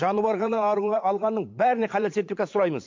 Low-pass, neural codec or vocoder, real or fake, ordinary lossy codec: 7.2 kHz; vocoder, 22.05 kHz, 80 mel bands, Vocos; fake; MP3, 48 kbps